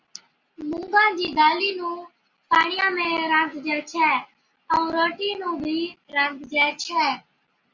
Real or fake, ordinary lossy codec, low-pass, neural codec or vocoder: real; Opus, 64 kbps; 7.2 kHz; none